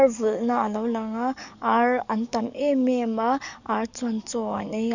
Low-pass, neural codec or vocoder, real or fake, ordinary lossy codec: 7.2 kHz; codec, 44.1 kHz, 7.8 kbps, DAC; fake; none